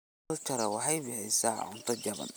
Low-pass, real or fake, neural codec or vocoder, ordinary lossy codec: none; real; none; none